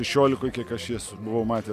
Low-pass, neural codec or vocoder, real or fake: 14.4 kHz; none; real